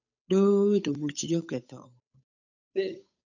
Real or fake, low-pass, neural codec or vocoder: fake; 7.2 kHz; codec, 16 kHz, 8 kbps, FunCodec, trained on Chinese and English, 25 frames a second